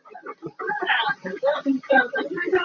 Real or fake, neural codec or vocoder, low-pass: fake; vocoder, 44.1 kHz, 128 mel bands, Pupu-Vocoder; 7.2 kHz